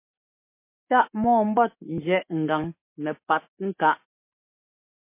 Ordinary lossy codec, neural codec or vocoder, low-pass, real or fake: MP3, 24 kbps; codec, 44.1 kHz, 7.8 kbps, Pupu-Codec; 3.6 kHz; fake